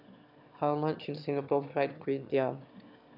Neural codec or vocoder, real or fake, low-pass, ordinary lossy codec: autoencoder, 22.05 kHz, a latent of 192 numbers a frame, VITS, trained on one speaker; fake; 5.4 kHz; none